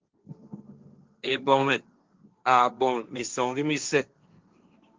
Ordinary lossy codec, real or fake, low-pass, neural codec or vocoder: Opus, 24 kbps; fake; 7.2 kHz; codec, 16 kHz, 1.1 kbps, Voila-Tokenizer